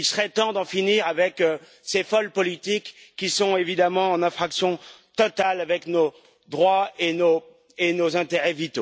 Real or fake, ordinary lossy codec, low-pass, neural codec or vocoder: real; none; none; none